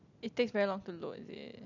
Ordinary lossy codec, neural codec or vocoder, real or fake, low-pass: none; none; real; 7.2 kHz